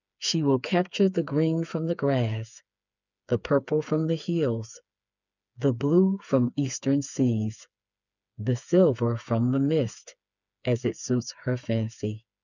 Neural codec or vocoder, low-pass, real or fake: codec, 16 kHz, 4 kbps, FreqCodec, smaller model; 7.2 kHz; fake